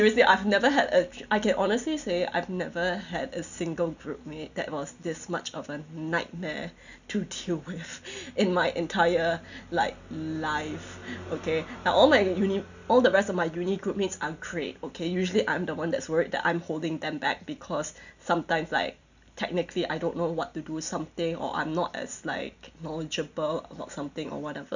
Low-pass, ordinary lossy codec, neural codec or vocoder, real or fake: 7.2 kHz; none; none; real